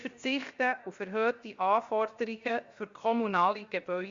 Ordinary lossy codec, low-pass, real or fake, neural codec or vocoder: Opus, 64 kbps; 7.2 kHz; fake; codec, 16 kHz, 0.7 kbps, FocalCodec